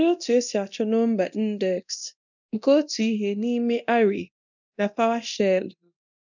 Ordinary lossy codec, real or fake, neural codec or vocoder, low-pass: none; fake; codec, 24 kHz, 0.9 kbps, DualCodec; 7.2 kHz